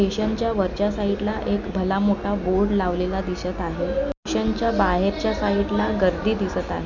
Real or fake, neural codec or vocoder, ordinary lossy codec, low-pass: real; none; none; 7.2 kHz